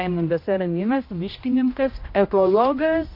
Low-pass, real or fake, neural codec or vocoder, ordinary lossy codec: 5.4 kHz; fake; codec, 16 kHz, 1 kbps, X-Codec, HuBERT features, trained on general audio; MP3, 32 kbps